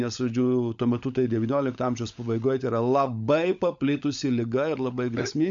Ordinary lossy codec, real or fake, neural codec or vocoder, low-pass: MP3, 64 kbps; fake; codec, 16 kHz, 16 kbps, FunCodec, trained on LibriTTS, 50 frames a second; 7.2 kHz